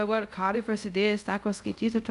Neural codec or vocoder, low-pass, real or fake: codec, 24 kHz, 0.5 kbps, DualCodec; 10.8 kHz; fake